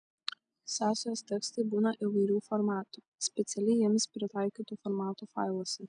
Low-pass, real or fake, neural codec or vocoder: 9.9 kHz; real; none